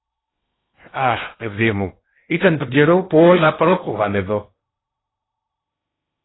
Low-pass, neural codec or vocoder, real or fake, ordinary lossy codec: 7.2 kHz; codec, 16 kHz in and 24 kHz out, 0.8 kbps, FocalCodec, streaming, 65536 codes; fake; AAC, 16 kbps